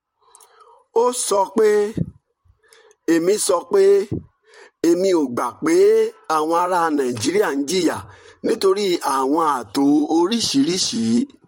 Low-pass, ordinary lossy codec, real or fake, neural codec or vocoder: 19.8 kHz; MP3, 64 kbps; fake; vocoder, 44.1 kHz, 128 mel bands, Pupu-Vocoder